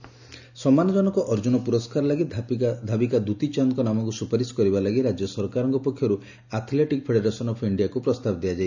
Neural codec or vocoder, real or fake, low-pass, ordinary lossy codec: none; real; 7.2 kHz; none